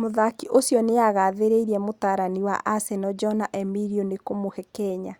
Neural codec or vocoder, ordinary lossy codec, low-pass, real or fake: none; Opus, 64 kbps; 19.8 kHz; real